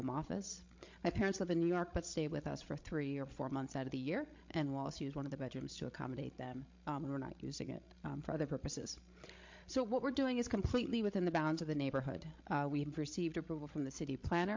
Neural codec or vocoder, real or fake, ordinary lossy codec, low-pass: codec, 16 kHz, 16 kbps, FreqCodec, larger model; fake; MP3, 48 kbps; 7.2 kHz